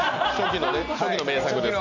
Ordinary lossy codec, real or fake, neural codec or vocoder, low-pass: none; real; none; 7.2 kHz